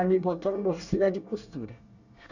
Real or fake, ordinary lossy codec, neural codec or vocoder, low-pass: fake; none; codec, 24 kHz, 1 kbps, SNAC; 7.2 kHz